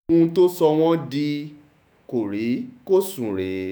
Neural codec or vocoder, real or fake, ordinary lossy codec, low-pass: autoencoder, 48 kHz, 128 numbers a frame, DAC-VAE, trained on Japanese speech; fake; none; none